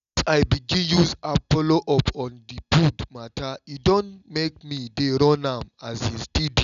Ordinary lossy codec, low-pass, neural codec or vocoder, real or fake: none; 7.2 kHz; none; real